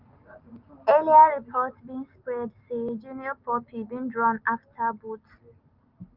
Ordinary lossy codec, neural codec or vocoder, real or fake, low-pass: Opus, 24 kbps; none; real; 5.4 kHz